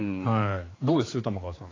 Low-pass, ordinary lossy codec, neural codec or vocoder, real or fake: 7.2 kHz; AAC, 32 kbps; codec, 16 kHz, 4 kbps, X-Codec, HuBERT features, trained on general audio; fake